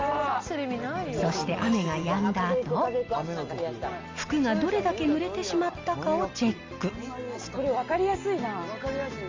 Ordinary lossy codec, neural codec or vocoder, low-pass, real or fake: Opus, 32 kbps; none; 7.2 kHz; real